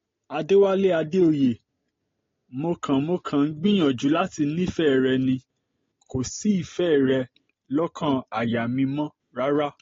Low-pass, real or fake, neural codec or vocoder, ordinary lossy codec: 7.2 kHz; real; none; AAC, 32 kbps